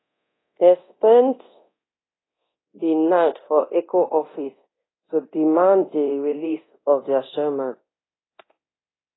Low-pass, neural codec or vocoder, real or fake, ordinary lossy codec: 7.2 kHz; codec, 24 kHz, 0.9 kbps, DualCodec; fake; AAC, 16 kbps